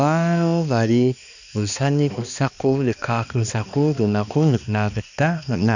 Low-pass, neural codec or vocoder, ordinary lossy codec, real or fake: 7.2 kHz; codec, 16 kHz, 2 kbps, X-Codec, HuBERT features, trained on balanced general audio; none; fake